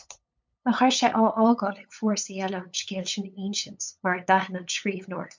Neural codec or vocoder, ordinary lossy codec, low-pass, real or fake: codec, 16 kHz, 8 kbps, FunCodec, trained on LibriTTS, 25 frames a second; MP3, 64 kbps; 7.2 kHz; fake